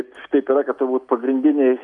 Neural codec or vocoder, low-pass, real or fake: none; 10.8 kHz; real